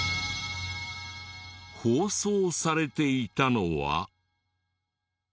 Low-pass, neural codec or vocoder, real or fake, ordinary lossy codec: none; none; real; none